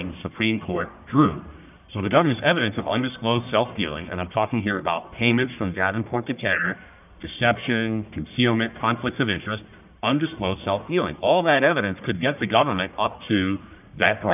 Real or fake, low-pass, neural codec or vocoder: fake; 3.6 kHz; codec, 44.1 kHz, 1.7 kbps, Pupu-Codec